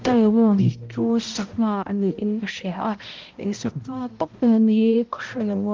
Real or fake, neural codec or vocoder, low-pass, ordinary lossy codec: fake; codec, 16 kHz, 0.5 kbps, X-Codec, HuBERT features, trained on balanced general audio; 7.2 kHz; Opus, 32 kbps